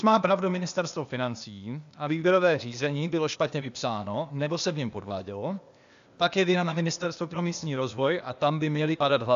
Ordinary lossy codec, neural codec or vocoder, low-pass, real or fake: AAC, 96 kbps; codec, 16 kHz, 0.8 kbps, ZipCodec; 7.2 kHz; fake